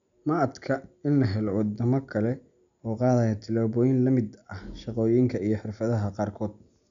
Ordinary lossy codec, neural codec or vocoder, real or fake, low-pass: MP3, 96 kbps; none; real; 7.2 kHz